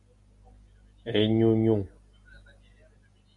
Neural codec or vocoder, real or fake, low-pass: none; real; 10.8 kHz